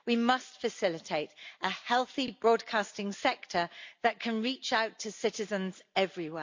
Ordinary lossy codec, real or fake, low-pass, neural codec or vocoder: MP3, 48 kbps; real; 7.2 kHz; none